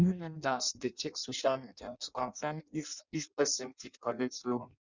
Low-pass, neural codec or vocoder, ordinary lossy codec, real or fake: 7.2 kHz; codec, 16 kHz in and 24 kHz out, 0.6 kbps, FireRedTTS-2 codec; Opus, 64 kbps; fake